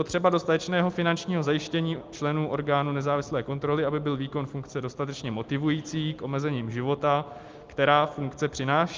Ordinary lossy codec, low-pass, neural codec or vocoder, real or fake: Opus, 24 kbps; 7.2 kHz; none; real